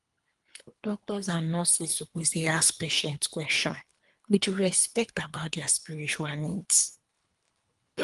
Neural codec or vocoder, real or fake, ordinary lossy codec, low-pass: codec, 24 kHz, 3 kbps, HILCodec; fake; Opus, 32 kbps; 10.8 kHz